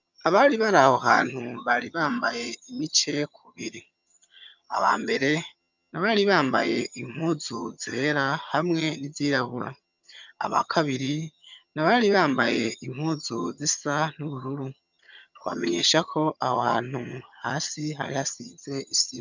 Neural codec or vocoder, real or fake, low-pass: vocoder, 22.05 kHz, 80 mel bands, HiFi-GAN; fake; 7.2 kHz